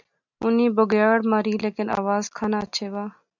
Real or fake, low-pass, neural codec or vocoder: real; 7.2 kHz; none